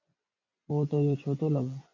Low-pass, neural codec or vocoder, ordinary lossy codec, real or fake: 7.2 kHz; vocoder, 24 kHz, 100 mel bands, Vocos; MP3, 64 kbps; fake